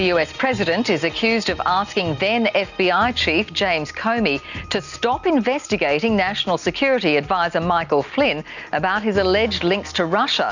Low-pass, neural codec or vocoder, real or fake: 7.2 kHz; none; real